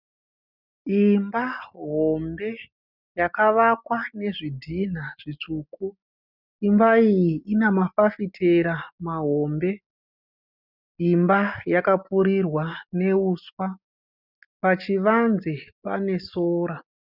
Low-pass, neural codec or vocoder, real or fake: 5.4 kHz; none; real